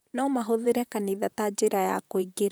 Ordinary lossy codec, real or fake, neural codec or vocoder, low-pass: none; fake; vocoder, 44.1 kHz, 128 mel bands, Pupu-Vocoder; none